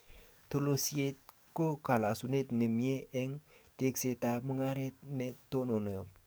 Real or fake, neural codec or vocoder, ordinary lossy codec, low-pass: fake; codec, 44.1 kHz, 7.8 kbps, DAC; none; none